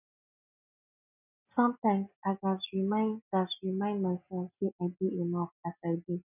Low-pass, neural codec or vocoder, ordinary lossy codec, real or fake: 3.6 kHz; none; none; real